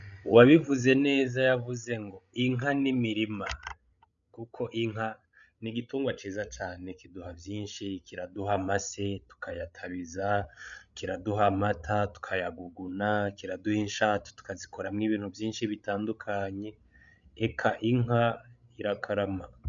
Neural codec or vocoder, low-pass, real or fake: codec, 16 kHz, 16 kbps, FreqCodec, larger model; 7.2 kHz; fake